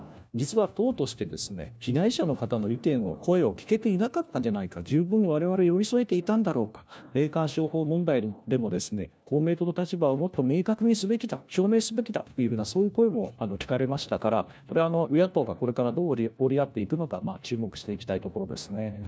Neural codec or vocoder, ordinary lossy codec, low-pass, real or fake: codec, 16 kHz, 1 kbps, FunCodec, trained on LibriTTS, 50 frames a second; none; none; fake